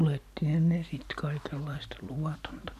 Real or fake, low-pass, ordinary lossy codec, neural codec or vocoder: real; 14.4 kHz; none; none